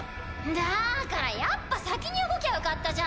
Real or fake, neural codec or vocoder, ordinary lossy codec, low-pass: real; none; none; none